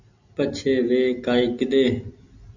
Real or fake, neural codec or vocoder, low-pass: real; none; 7.2 kHz